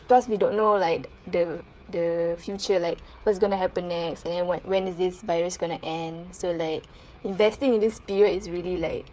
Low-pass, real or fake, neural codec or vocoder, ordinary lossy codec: none; fake; codec, 16 kHz, 8 kbps, FreqCodec, smaller model; none